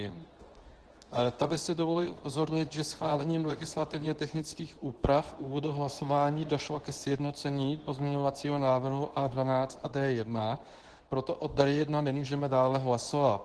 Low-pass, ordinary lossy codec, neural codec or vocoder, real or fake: 10.8 kHz; Opus, 16 kbps; codec, 24 kHz, 0.9 kbps, WavTokenizer, medium speech release version 2; fake